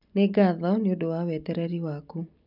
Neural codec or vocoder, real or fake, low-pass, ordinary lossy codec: none; real; 5.4 kHz; none